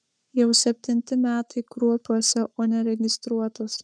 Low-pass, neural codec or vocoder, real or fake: 9.9 kHz; codec, 44.1 kHz, 7.8 kbps, Pupu-Codec; fake